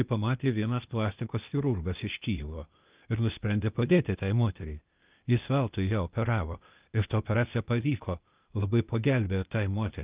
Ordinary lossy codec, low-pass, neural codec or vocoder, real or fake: Opus, 24 kbps; 3.6 kHz; codec, 16 kHz, 0.8 kbps, ZipCodec; fake